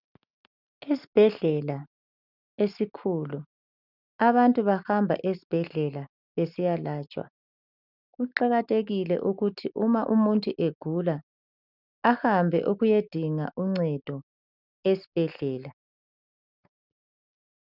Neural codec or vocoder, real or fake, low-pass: none; real; 5.4 kHz